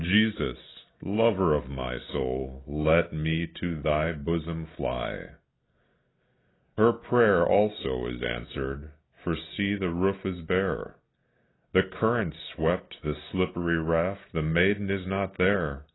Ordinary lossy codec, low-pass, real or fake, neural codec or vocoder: AAC, 16 kbps; 7.2 kHz; real; none